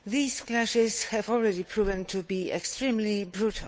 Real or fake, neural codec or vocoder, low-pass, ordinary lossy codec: fake; codec, 16 kHz, 8 kbps, FunCodec, trained on Chinese and English, 25 frames a second; none; none